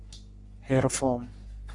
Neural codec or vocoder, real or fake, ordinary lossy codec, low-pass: codec, 44.1 kHz, 3.4 kbps, Pupu-Codec; fake; Opus, 64 kbps; 10.8 kHz